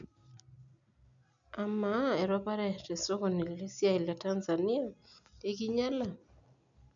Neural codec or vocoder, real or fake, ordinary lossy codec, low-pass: none; real; none; 7.2 kHz